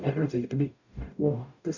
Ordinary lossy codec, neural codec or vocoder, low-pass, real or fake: none; codec, 44.1 kHz, 0.9 kbps, DAC; 7.2 kHz; fake